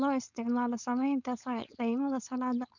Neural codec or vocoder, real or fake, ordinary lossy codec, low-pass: codec, 16 kHz, 4.8 kbps, FACodec; fake; none; 7.2 kHz